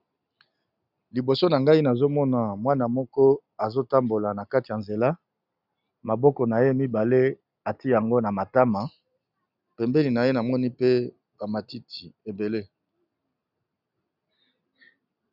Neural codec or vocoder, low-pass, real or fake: none; 5.4 kHz; real